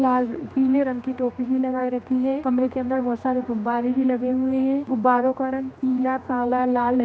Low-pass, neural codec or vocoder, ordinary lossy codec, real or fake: none; codec, 16 kHz, 2 kbps, X-Codec, HuBERT features, trained on general audio; none; fake